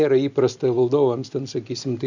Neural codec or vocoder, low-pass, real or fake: none; 7.2 kHz; real